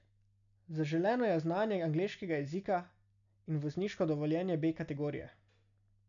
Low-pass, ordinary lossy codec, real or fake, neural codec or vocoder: 7.2 kHz; none; real; none